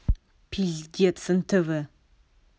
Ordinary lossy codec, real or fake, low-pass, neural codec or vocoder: none; real; none; none